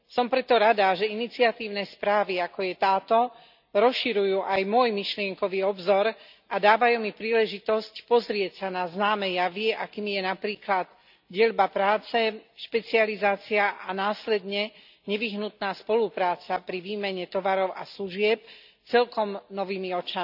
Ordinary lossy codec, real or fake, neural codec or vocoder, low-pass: none; real; none; 5.4 kHz